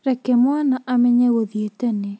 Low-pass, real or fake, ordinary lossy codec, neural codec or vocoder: none; real; none; none